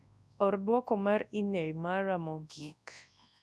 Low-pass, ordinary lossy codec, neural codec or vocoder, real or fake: none; none; codec, 24 kHz, 0.9 kbps, WavTokenizer, large speech release; fake